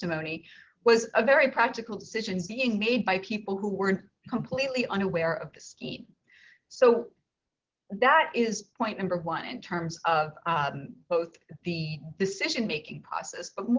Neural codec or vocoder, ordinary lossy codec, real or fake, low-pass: none; Opus, 16 kbps; real; 7.2 kHz